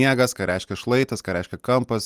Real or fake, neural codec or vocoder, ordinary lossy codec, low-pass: real; none; Opus, 32 kbps; 14.4 kHz